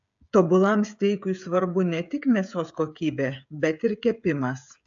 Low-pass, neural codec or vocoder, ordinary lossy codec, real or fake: 7.2 kHz; codec, 16 kHz, 16 kbps, FreqCodec, smaller model; AAC, 64 kbps; fake